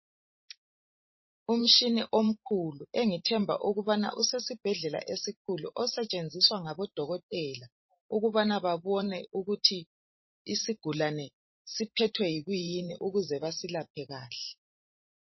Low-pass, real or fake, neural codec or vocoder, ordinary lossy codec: 7.2 kHz; fake; vocoder, 44.1 kHz, 128 mel bands every 512 samples, BigVGAN v2; MP3, 24 kbps